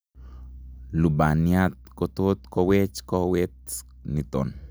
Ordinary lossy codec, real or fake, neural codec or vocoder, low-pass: none; real; none; none